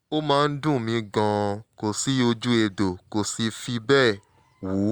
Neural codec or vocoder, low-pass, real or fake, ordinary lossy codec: none; 19.8 kHz; real; Opus, 64 kbps